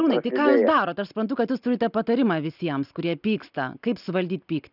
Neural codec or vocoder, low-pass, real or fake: none; 5.4 kHz; real